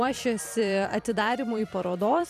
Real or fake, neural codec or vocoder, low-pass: fake; vocoder, 44.1 kHz, 128 mel bands every 256 samples, BigVGAN v2; 14.4 kHz